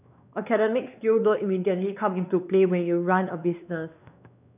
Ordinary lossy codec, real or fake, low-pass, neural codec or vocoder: none; fake; 3.6 kHz; codec, 16 kHz, 2 kbps, X-Codec, WavLM features, trained on Multilingual LibriSpeech